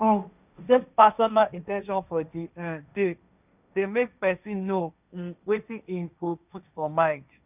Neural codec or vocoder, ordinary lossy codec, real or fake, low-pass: codec, 16 kHz, 1.1 kbps, Voila-Tokenizer; none; fake; 3.6 kHz